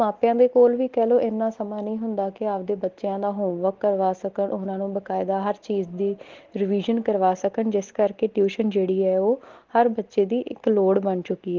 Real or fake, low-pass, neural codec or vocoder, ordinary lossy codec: real; 7.2 kHz; none; Opus, 16 kbps